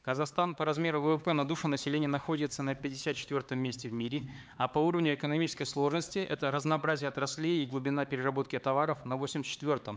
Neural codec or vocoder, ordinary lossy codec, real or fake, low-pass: codec, 16 kHz, 4 kbps, X-Codec, HuBERT features, trained on LibriSpeech; none; fake; none